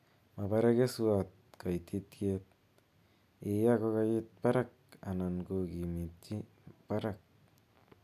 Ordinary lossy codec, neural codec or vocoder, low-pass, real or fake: none; none; 14.4 kHz; real